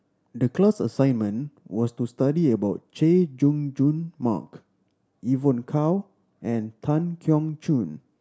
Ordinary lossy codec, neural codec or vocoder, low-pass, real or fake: none; none; none; real